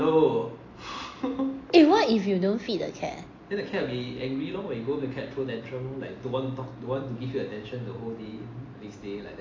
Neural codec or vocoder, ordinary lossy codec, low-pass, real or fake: none; AAC, 32 kbps; 7.2 kHz; real